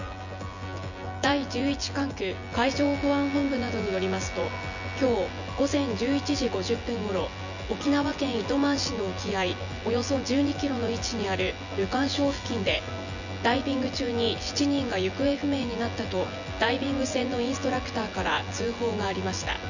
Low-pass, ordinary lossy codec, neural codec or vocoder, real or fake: 7.2 kHz; none; vocoder, 24 kHz, 100 mel bands, Vocos; fake